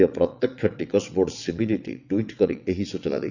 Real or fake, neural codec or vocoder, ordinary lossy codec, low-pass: fake; vocoder, 22.05 kHz, 80 mel bands, WaveNeXt; none; 7.2 kHz